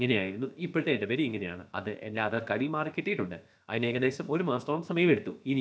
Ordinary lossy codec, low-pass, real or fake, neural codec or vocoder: none; none; fake; codec, 16 kHz, about 1 kbps, DyCAST, with the encoder's durations